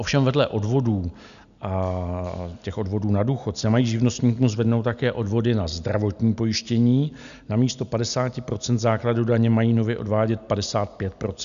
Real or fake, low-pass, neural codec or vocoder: real; 7.2 kHz; none